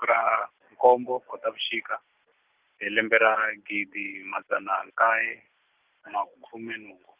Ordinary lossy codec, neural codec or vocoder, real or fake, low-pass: Opus, 24 kbps; none; real; 3.6 kHz